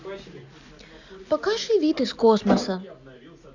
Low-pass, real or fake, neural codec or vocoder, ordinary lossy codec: 7.2 kHz; real; none; none